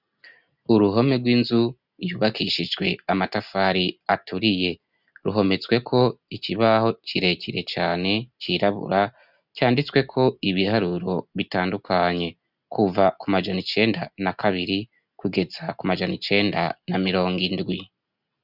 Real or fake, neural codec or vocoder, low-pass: real; none; 5.4 kHz